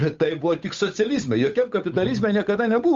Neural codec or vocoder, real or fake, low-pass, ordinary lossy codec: codec, 16 kHz, 8 kbps, FunCodec, trained on Chinese and English, 25 frames a second; fake; 7.2 kHz; Opus, 32 kbps